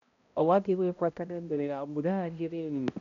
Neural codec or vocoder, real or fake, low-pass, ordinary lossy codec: codec, 16 kHz, 0.5 kbps, X-Codec, HuBERT features, trained on balanced general audio; fake; 7.2 kHz; MP3, 64 kbps